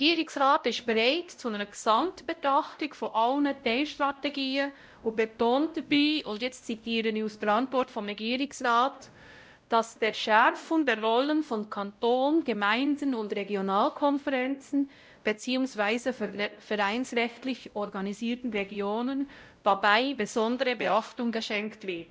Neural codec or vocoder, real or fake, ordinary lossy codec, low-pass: codec, 16 kHz, 0.5 kbps, X-Codec, WavLM features, trained on Multilingual LibriSpeech; fake; none; none